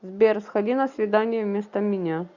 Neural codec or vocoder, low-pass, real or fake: none; 7.2 kHz; real